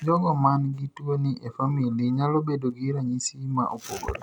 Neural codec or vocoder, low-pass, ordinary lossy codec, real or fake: none; none; none; real